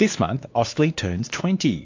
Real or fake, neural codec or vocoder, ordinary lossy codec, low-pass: fake; codec, 16 kHz, 2 kbps, X-Codec, WavLM features, trained on Multilingual LibriSpeech; AAC, 48 kbps; 7.2 kHz